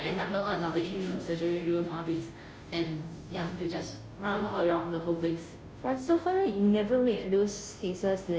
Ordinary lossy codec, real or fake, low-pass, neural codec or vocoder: none; fake; none; codec, 16 kHz, 0.5 kbps, FunCodec, trained on Chinese and English, 25 frames a second